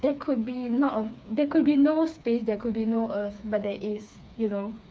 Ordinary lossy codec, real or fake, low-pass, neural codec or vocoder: none; fake; none; codec, 16 kHz, 4 kbps, FreqCodec, smaller model